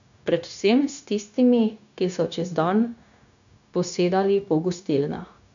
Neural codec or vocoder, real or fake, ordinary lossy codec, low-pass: codec, 16 kHz, 0.9 kbps, LongCat-Audio-Codec; fake; none; 7.2 kHz